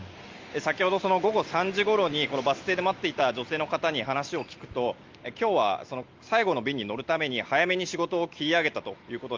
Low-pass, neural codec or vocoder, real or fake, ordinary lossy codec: 7.2 kHz; none; real; Opus, 32 kbps